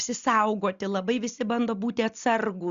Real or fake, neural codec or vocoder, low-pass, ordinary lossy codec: real; none; 7.2 kHz; Opus, 64 kbps